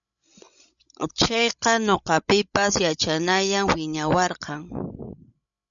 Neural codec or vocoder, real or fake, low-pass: codec, 16 kHz, 16 kbps, FreqCodec, larger model; fake; 7.2 kHz